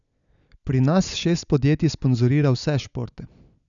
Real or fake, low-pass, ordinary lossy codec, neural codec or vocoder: real; 7.2 kHz; none; none